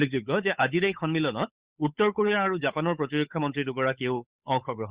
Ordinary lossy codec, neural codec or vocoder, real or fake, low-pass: Opus, 64 kbps; codec, 16 kHz, 8 kbps, FunCodec, trained on Chinese and English, 25 frames a second; fake; 3.6 kHz